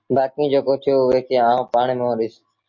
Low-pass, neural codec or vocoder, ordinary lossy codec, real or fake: 7.2 kHz; none; MP3, 48 kbps; real